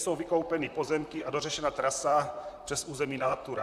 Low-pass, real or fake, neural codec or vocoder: 14.4 kHz; fake; vocoder, 44.1 kHz, 128 mel bands, Pupu-Vocoder